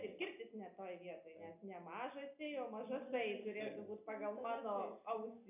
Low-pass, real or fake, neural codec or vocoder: 3.6 kHz; real; none